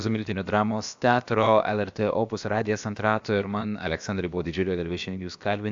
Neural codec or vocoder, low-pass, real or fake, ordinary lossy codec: codec, 16 kHz, about 1 kbps, DyCAST, with the encoder's durations; 7.2 kHz; fake; AAC, 64 kbps